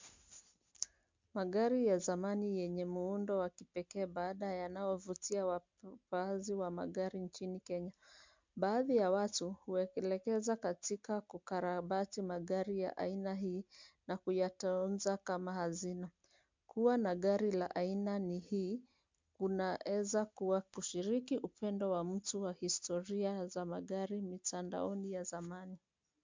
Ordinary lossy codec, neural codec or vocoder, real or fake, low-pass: MP3, 64 kbps; none; real; 7.2 kHz